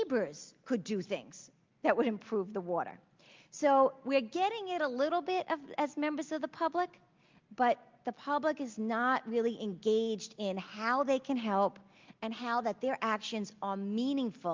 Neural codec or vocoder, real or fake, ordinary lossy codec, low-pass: none; real; Opus, 32 kbps; 7.2 kHz